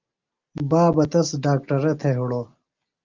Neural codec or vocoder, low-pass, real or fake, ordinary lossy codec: none; 7.2 kHz; real; Opus, 24 kbps